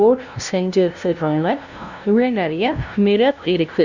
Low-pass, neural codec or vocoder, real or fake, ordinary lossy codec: 7.2 kHz; codec, 16 kHz, 0.5 kbps, FunCodec, trained on LibriTTS, 25 frames a second; fake; Opus, 64 kbps